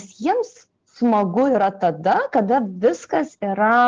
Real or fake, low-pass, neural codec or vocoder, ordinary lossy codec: real; 7.2 kHz; none; Opus, 16 kbps